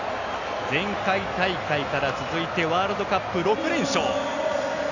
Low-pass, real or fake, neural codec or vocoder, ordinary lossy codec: 7.2 kHz; real; none; none